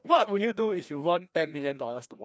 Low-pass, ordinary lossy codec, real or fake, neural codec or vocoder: none; none; fake; codec, 16 kHz, 1 kbps, FreqCodec, larger model